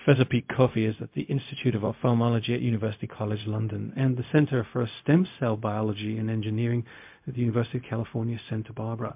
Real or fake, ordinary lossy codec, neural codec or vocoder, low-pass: fake; MP3, 32 kbps; codec, 16 kHz, 0.4 kbps, LongCat-Audio-Codec; 3.6 kHz